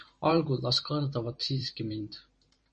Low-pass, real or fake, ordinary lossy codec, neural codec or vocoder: 10.8 kHz; fake; MP3, 32 kbps; vocoder, 44.1 kHz, 128 mel bands every 512 samples, BigVGAN v2